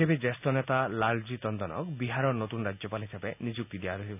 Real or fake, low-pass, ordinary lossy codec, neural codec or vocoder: real; 3.6 kHz; none; none